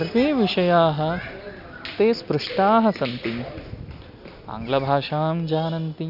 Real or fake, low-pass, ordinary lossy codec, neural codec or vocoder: real; 5.4 kHz; none; none